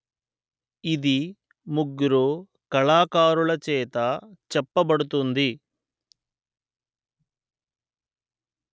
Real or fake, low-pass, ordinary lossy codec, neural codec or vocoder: real; none; none; none